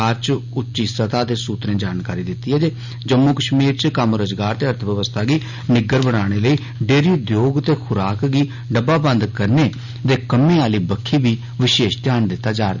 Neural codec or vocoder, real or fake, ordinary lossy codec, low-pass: none; real; none; 7.2 kHz